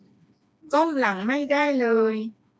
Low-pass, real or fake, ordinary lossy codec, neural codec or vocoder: none; fake; none; codec, 16 kHz, 2 kbps, FreqCodec, smaller model